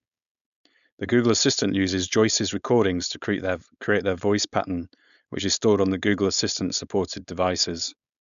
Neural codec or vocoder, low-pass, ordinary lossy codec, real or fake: codec, 16 kHz, 4.8 kbps, FACodec; 7.2 kHz; none; fake